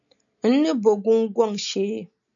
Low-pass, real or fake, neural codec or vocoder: 7.2 kHz; real; none